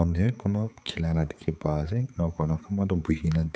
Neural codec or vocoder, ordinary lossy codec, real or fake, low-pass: codec, 16 kHz, 4 kbps, X-Codec, HuBERT features, trained on balanced general audio; none; fake; none